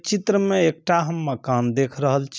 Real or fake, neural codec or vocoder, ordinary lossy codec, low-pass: real; none; none; none